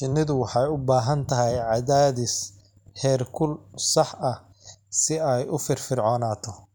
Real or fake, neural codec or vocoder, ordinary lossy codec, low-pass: real; none; none; none